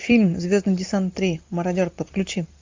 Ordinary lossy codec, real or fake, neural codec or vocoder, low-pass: MP3, 64 kbps; real; none; 7.2 kHz